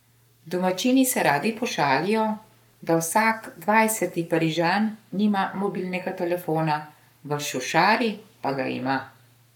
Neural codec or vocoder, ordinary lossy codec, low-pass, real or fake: codec, 44.1 kHz, 7.8 kbps, Pupu-Codec; none; 19.8 kHz; fake